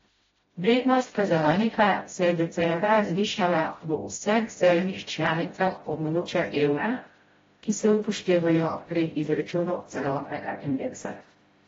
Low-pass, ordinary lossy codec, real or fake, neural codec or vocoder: 7.2 kHz; AAC, 24 kbps; fake; codec, 16 kHz, 0.5 kbps, FreqCodec, smaller model